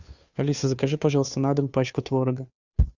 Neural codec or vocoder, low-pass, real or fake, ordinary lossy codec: codec, 16 kHz, 2 kbps, FunCodec, trained on Chinese and English, 25 frames a second; 7.2 kHz; fake; Opus, 64 kbps